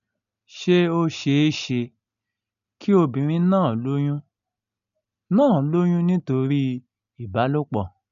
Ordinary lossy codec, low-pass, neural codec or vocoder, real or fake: none; 7.2 kHz; none; real